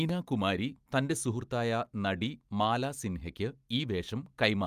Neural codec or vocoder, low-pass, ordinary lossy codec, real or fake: none; 14.4 kHz; Opus, 32 kbps; real